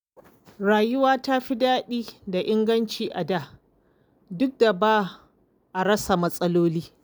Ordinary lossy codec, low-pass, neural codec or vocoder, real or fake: none; none; none; real